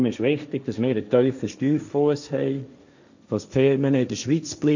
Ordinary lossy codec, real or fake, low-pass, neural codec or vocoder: none; fake; 7.2 kHz; codec, 16 kHz, 1.1 kbps, Voila-Tokenizer